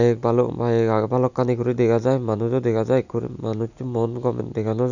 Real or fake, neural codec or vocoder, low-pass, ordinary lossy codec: real; none; 7.2 kHz; none